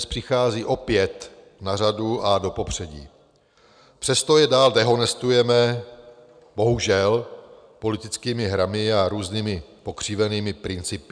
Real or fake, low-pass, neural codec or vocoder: real; 9.9 kHz; none